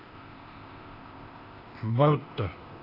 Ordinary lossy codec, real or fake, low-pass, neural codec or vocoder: AAC, 32 kbps; fake; 5.4 kHz; codec, 16 kHz, 0.8 kbps, ZipCodec